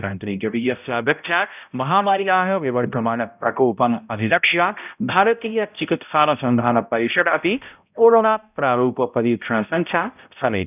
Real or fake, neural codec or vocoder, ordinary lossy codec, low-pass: fake; codec, 16 kHz, 0.5 kbps, X-Codec, HuBERT features, trained on balanced general audio; none; 3.6 kHz